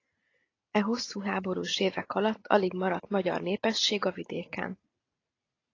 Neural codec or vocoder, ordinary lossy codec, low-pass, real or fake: none; AAC, 32 kbps; 7.2 kHz; real